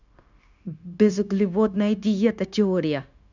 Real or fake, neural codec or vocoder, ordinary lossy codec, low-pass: fake; codec, 16 kHz, 0.9 kbps, LongCat-Audio-Codec; none; 7.2 kHz